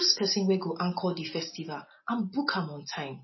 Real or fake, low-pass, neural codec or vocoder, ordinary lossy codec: real; 7.2 kHz; none; MP3, 24 kbps